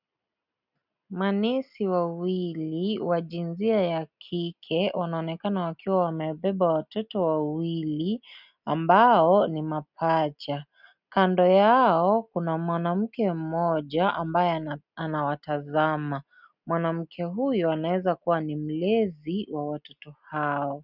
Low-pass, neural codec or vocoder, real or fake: 5.4 kHz; none; real